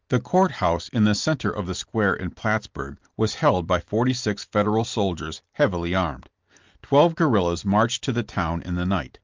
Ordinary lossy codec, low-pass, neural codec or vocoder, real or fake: Opus, 24 kbps; 7.2 kHz; none; real